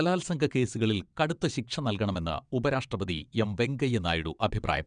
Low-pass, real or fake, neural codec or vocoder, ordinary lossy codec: 9.9 kHz; fake; vocoder, 22.05 kHz, 80 mel bands, WaveNeXt; none